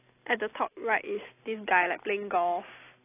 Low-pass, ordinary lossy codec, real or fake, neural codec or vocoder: 3.6 kHz; AAC, 16 kbps; real; none